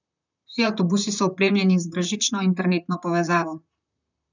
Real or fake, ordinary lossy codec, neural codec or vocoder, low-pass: fake; none; vocoder, 44.1 kHz, 128 mel bands, Pupu-Vocoder; 7.2 kHz